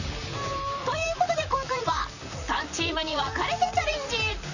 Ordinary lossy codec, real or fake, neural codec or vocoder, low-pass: none; fake; vocoder, 44.1 kHz, 128 mel bands, Pupu-Vocoder; 7.2 kHz